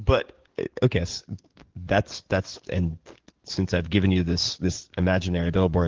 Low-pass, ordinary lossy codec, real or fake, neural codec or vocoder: 7.2 kHz; Opus, 16 kbps; fake; codec, 44.1 kHz, 7.8 kbps, DAC